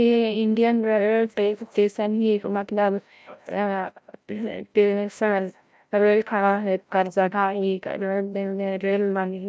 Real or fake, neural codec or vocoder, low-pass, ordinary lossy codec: fake; codec, 16 kHz, 0.5 kbps, FreqCodec, larger model; none; none